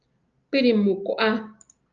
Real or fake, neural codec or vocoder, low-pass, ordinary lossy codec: real; none; 7.2 kHz; Opus, 24 kbps